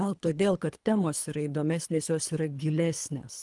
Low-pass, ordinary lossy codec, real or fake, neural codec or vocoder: 10.8 kHz; Opus, 32 kbps; fake; codec, 24 kHz, 3 kbps, HILCodec